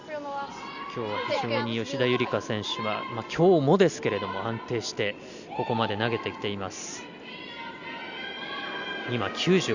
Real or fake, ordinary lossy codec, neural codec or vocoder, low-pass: real; Opus, 64 kbps; none; 7.2 kHz